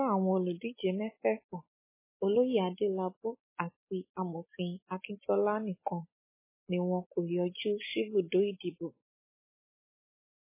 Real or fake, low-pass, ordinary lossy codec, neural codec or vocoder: real; 3.6 kHz; MP3, 16 kbps; none